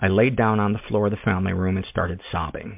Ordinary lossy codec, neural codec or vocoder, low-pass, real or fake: MP3, 32 kbps; none; 3.6 kHz; real